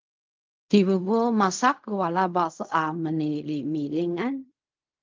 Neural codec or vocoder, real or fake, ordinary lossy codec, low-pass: codec, 16 kHz in and 24 kHz out, 0.4 kbps, LongCat-Audio-Codec, fine tuned four codebook decoder; fake; Opus, 24 kbps; 7.2 kHz